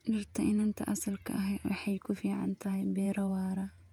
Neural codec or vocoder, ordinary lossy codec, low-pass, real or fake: vocoder, 48 kHz, 128 mel bands, Vocos; none; 19.8 kHz; fake